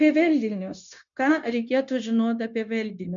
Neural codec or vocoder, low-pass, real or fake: codec, 16 kHz, 0.9 kbps, LongCat-Audio-Codec; 7.2 kHz; fake